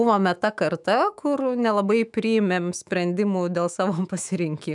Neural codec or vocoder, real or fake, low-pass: autoencoder, 48 kHz, 128 numbers a frame, DAC-VAE, trained on Japanese speech; fake; 10.8 kHz